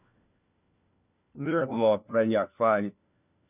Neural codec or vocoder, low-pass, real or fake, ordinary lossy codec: codec, 16 kHz, 1 kbps, FunCodec, trained on LibriTTS, 50 frames a second; 3.6 kHz; fake; none